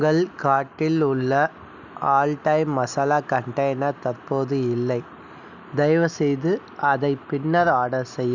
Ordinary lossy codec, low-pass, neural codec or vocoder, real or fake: none; 7.2 kHz; none; real